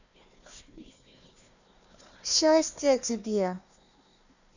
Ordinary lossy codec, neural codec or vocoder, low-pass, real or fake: none; codec, 16 kHz, 1 kbps, FunCodec, trained on Chinese and English, 50 frames a second; 7.2 kHz; fake